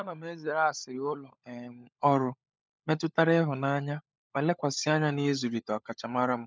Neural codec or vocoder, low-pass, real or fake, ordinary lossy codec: codec, 16 kHz, 16 kbps, FunCodec, trained on LibriTTS, 50 frames a second; none; fake; none